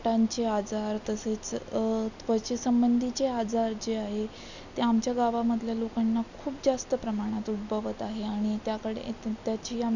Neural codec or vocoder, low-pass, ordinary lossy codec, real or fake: none; 7.2 kHz; none; real